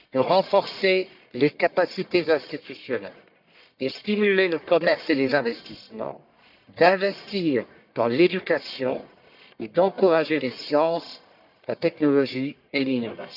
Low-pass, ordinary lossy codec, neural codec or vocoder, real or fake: 5.4 kHz; none; codec, 44.1 kHz, 1.7 kbps, Pupu-Codec; fake